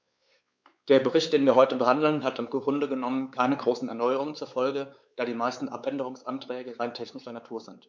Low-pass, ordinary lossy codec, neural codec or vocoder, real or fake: none; none; codec, 16 kHz, 4 kbps, X-Codec, WavLM features, trained on Multilingual LibriSpeech; fake